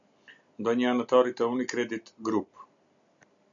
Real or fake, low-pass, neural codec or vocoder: real; 7.2 kHz; none